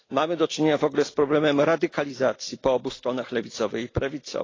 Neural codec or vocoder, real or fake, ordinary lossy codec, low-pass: none; real; AAC, 32 kbps; 7.2 kHz